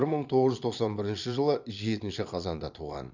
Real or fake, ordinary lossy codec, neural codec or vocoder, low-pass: fake; none; vocoder, 22.05 kHz, 80 mel bands, Vocos; 7.2 kHz